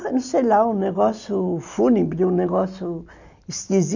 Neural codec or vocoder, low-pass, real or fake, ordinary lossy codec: none; 7.2 kHz; real; none